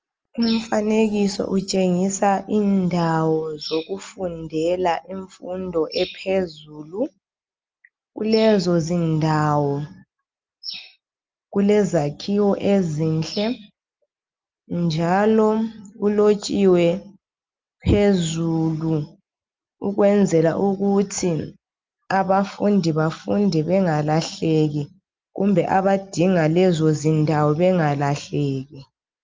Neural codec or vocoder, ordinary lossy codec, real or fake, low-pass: none; Opus, 24 kbps; real; 7.2 kHz